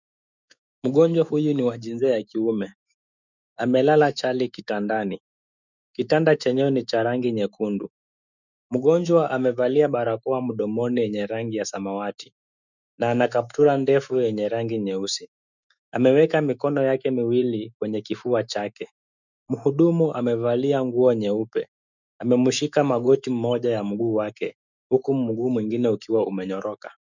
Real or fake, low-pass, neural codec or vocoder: fake; 7.2 kHz; autoencoder, 48 kHz, 128 numbers a frame, DAC-VAE, trained on Japanese speech